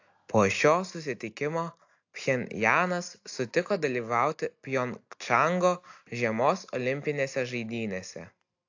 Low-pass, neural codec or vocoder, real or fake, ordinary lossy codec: 7.2 kHz; none; real; AAC, 48 kbps